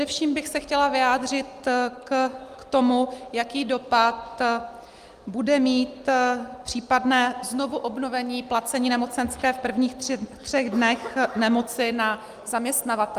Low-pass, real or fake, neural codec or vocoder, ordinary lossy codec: 14.4 kHz; real; none; Opus, 32 kbps